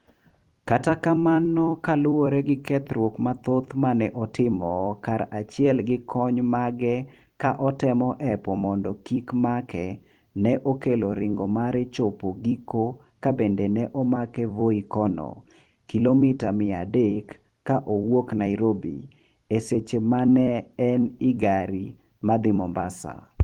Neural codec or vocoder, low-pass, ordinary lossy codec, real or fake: vocoder, 44.1 kHz, 128 mel bands every 256 samples, BigVGAN v2; 19.8 kHz; Opus, 24 kbps; fake